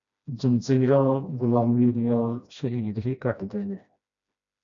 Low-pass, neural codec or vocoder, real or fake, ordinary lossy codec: 7.2 kHz; codec, 16 kHz, 1 kbps, FreqCodec, smaller model; fake; MP3, 96 kbps